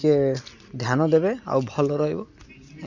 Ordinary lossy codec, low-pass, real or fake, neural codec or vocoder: none; 7.2 kHz; real; none